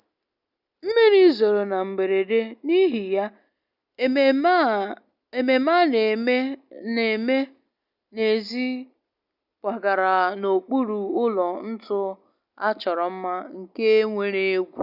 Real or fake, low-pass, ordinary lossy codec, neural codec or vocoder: real; 5.4 kHz; none; none